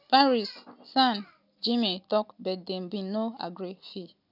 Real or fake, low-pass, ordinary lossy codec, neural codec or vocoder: real; 5.4 kHz; none; none